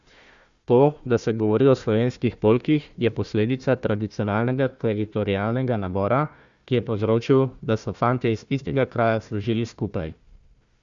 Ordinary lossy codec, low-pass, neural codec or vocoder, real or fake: Opus, 64 kbps; 7.2 kHz; codec, 16 kHz, 1 kbps, FunCodec, trained on Chinese and English, 50 frames a second; fake